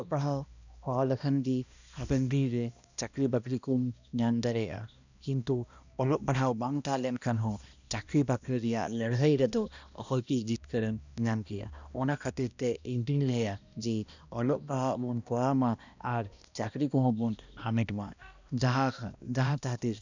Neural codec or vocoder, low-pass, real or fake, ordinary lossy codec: codec, 16 kHz, 1 kbps, X-Codec, HuBERT features, trained on balanced general audio; 7.2 kHz; fake; none